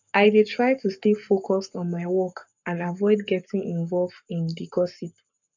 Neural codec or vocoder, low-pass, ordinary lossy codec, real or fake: codec, 44.1 kHz, 7.8 kbps, Pupu-Codec; 7.2 kHz; none; fake